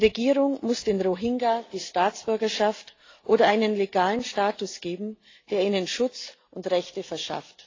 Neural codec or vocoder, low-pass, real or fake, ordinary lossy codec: none; 7.2 kHz; real; AAC, 32 kbps